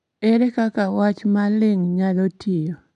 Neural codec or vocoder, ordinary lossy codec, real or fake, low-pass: none; none; real; 10.8 kHz